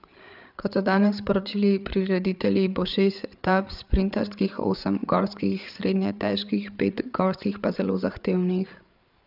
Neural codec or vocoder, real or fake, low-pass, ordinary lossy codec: codec, 16 kHz, 8 kbps, FreqCodec, larger model; fake; 5.4 kHz; none